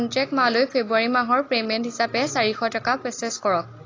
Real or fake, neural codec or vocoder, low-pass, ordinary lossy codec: real; none; 7.2 kHz; AAC, 32 kbps